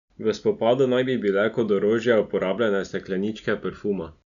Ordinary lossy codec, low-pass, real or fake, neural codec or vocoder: none; 7.2 kHz; real; none